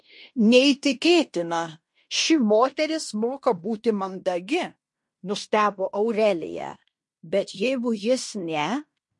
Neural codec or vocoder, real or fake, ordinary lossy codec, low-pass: codec, 16 kHz in and 24 kHz out, 0.9 kbps, LongCat-Audio-Codec, fine tuned four codebook decoder; fake; MP3, 48 kbps; 10.8 kHz